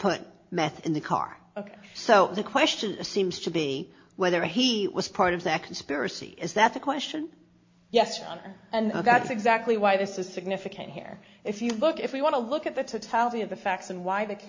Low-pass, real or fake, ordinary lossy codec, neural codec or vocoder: 7.2 kHz; real; MP3, 48 kbps; none